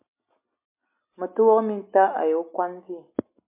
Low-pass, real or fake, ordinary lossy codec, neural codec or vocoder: 3.6 kHz; real; MP3, 24 kbps; none